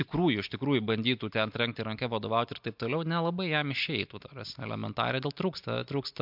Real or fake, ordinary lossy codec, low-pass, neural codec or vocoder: real; MP3, 48 kbps; 5.4 kHz; none